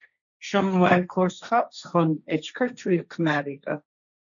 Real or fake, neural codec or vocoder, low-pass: fake; codec, 16 kHz, 1.1 kbps, Voila-Tokenizer; 7.2 kHz